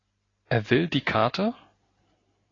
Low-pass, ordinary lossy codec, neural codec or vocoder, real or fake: 7.2 kHz; AAC, 32 kbps; none; real